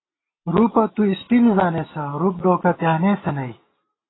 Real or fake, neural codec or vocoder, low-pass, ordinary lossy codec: real; none; 7.2 kHz; AAC, 16 kbps